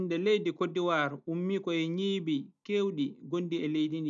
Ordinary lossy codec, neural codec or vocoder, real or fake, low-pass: none; none; real; 7.2 kHz